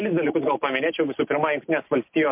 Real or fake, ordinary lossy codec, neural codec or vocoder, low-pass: real; MP3, 32 kbps; none; 3.6 kHz